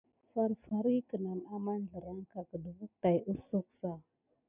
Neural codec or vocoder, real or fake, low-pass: none; real; 3.6 kHz